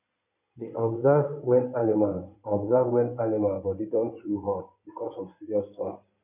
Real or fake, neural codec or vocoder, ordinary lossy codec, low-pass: fake; codec, 16 kHz in and 24 kHz out, 2.2 kbps, FireRedTTS-2 codec; none; 3.6 kHz